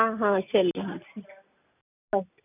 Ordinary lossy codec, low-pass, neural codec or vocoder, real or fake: none; 3.6 kHz; none; real